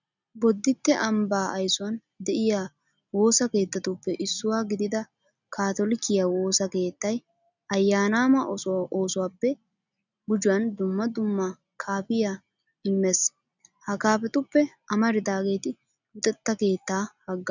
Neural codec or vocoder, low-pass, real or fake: none; 7.2 kHz; real